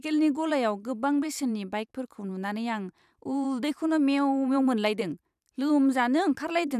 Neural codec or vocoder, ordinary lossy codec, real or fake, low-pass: vocoder, 44.1 kHz, 128 mel bands every 512 samples, BigVGAN v2; none; fake; 14.4 kHz